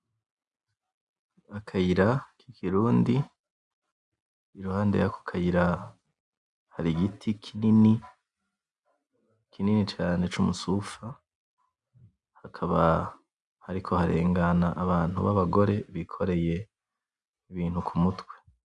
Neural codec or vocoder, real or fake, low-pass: none; real; 10.8 kHz